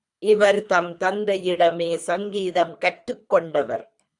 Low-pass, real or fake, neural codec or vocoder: 10.8 kHz; fake; codec, 24 kHz, 3 kbps, HILCodec